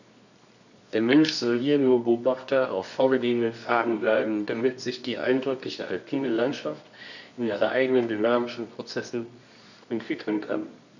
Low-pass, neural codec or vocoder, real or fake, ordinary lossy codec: 7.2 kHz; codec, 24 kHz, 0.9 kbps, WavTokenizer, medium music audio release; fake; none